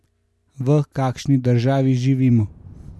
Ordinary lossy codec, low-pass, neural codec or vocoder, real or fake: none; none; none; real